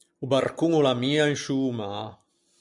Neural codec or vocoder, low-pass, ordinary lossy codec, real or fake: none; 10.8 kHz; MP3, 96 kbps; real